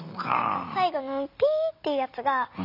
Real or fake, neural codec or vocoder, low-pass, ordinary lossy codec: real; none; 5.4 kHz; none